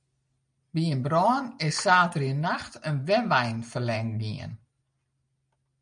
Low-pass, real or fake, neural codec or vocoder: 9.9 kHz; real; none